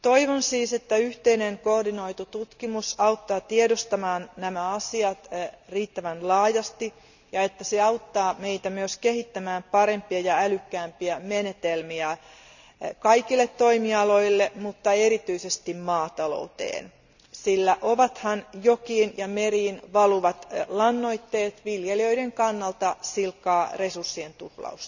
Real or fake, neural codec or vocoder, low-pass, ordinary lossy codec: real; none; 7.2 kHz; none